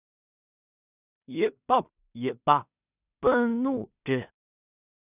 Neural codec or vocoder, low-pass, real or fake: codec, 16 kHz in and 24 kHz out, 0.4 kbps, LongCat-Audio-Codec, two codebook decoder; 3.6 kHz; fake